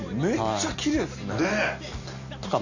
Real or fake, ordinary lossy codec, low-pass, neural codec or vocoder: real; none; 7.2 kHz; none